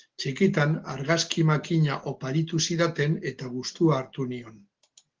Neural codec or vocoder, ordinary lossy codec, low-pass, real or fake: none; Opus, 16 kbps; 7.2 kHz; real